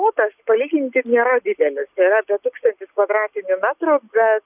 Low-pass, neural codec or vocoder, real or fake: 3.6 kHz; none; real